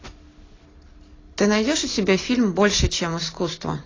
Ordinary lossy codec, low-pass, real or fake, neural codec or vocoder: AAC, 32 kbps; 7.2 kHz; real; none